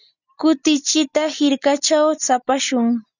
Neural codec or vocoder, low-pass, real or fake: none; 7.2 kHz; real